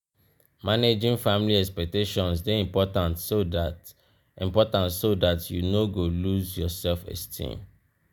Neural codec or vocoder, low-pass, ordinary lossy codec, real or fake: none; none; none; real